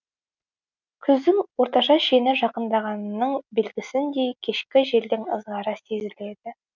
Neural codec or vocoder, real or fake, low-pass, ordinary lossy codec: none; real; 7.2 kHz; none